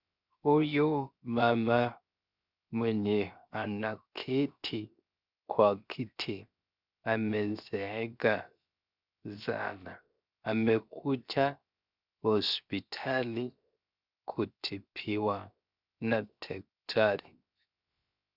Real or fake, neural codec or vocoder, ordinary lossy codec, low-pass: fake; codec, 16 kHz, 0.7 kbps, FocalCodec; Opus, 64 kbps; 5.4 kHz